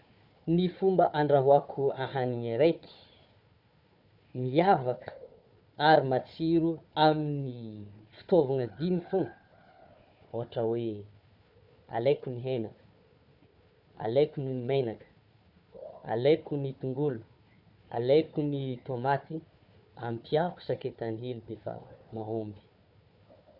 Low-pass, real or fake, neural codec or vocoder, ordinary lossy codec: 5.4 kHz; fake; codec, 16 kHz, 4 kbps, FunCodec, trained on Chinese and English, 50 frames a second; none